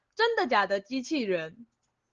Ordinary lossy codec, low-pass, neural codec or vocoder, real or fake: Opus, 16 kbps; 7.2 kHz; none; real